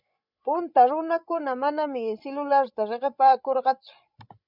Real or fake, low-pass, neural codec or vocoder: fake; 5.4 kHz; codec, 16 kHz, 16 kbps, FreqCodec, larger model